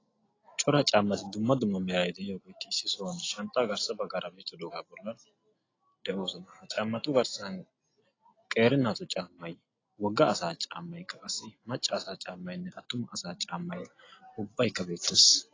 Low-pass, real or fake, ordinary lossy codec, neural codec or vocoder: 7.2 kHz; real; AAC, 32 kbps; none